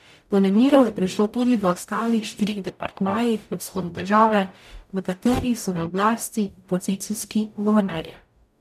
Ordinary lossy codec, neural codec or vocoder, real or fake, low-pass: none; codec, 44.1 kHz, 0.9 kbps, DAC; fake; 14.4 kHz